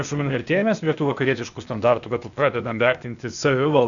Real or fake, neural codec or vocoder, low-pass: fake; codec, 16 kHz, 0.8 kbps, ZipCodec; 7.2 kHz